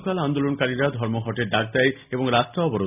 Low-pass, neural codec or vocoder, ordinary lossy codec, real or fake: 3.6 kHz; none; none; real